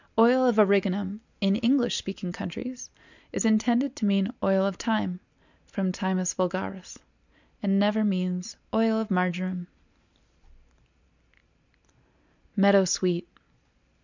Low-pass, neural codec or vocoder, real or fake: 7.2 kHz; none; real